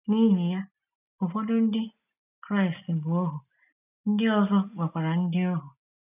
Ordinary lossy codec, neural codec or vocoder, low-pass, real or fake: none; none; 3.6 kHz; real